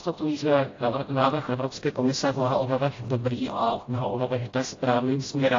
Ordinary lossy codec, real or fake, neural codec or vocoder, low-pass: AAC, 32 kbps; fake; codec, 16 kHz, 0.5 kbps, FreqCodec, smaller model; 7.2 kHz